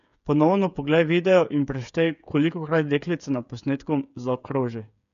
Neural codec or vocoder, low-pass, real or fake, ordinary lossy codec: codec, 16 kHz, 8 kbps, FreqCodec, smaller model; 7.2 kHz; fake; none